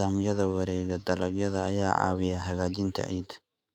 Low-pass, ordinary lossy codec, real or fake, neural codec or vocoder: 19.8 kHz; Opus, 64 kbps; fake; autoencoder, 48 kHz, 128 numbers a frame, DAC-VAE, trained on Japanese speech